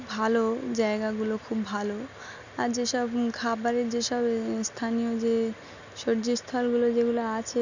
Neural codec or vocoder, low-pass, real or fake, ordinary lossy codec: none; 7.2 kHz; real; none